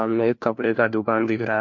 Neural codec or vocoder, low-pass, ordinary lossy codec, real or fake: codec, 16 kHz, 1 kbps, FunCodec, trained on LibriTTS, 50 frames a second; 7.2 kHz; MP3, 48 kbps; fake